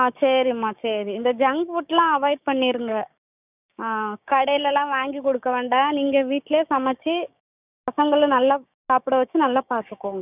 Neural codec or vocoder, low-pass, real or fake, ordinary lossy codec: codec, 16 kHz, 6 kbps, DAC; 3.6 kHz; fake; none